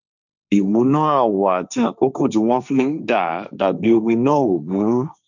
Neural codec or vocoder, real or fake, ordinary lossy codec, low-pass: codec, 16 kHz, 1.1 kbps, Voila-Tokenizer; fake; none; 7.2 kHz